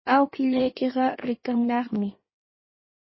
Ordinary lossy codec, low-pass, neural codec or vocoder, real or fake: MP3, 24 kbps; 7.2 kHz; codec, 16 kHz in and 24 kHz out, 1.1 kbps, FireRedTTS-2 codec; fake